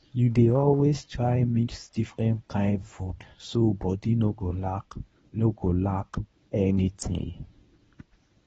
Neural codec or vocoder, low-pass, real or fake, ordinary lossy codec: codec, 24 kHz, 0.9 kbps, WavTokenizer, medium speech release version 1; 10.8 kHz; fake; AAC, 24 kbps